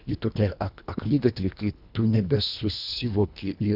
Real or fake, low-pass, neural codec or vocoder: fake; 5.4 kHz; codec, 24 kHz, 1.5 kbps, HILCodec